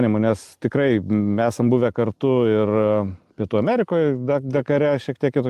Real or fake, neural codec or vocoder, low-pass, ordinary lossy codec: real; none; 14.4 kHz; Opus, 32 kbps